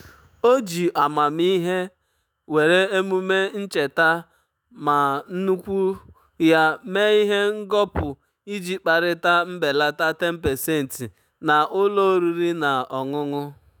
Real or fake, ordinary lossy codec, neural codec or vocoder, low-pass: fake; none; autoencoder, 48 kHz, 128 numbers a frame, DAC-VAE, trained on Japanese speech; none